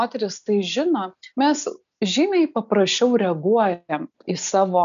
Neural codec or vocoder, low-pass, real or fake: none; 7.2 kHz; real